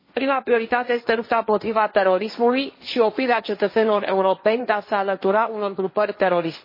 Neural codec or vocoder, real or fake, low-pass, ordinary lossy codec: codec, 16 kHz, 1.1 kbps, Voila-Tokenizer; fake; 5.4 kHz; MP3, 24 kbps